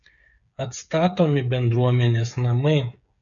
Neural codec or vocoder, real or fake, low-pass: codec, 16 kHz, 8 kbps, FreqCodec, smaller model; fake; 7.2 kHz